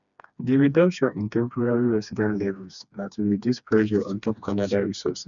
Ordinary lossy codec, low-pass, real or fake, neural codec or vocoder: none; 7.2 kHz; fake; codec, 16 kHz, 2 kbps, FreqCodec, smaller model